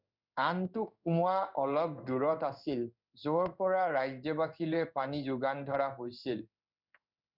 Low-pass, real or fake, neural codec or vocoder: 5.4 kHz; fake; codec, 16 kHz in and 24 kHz out, 1 kbps, XY-Tokenizer